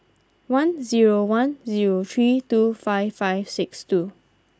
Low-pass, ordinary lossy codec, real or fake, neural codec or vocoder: none; none; real; none